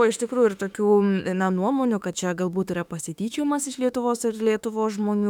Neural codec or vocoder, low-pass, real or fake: autoencoder, 48 kHz, 32 numbers a frame, DAC-VAE, trained on Japanese speech; 19.8 kHz; fake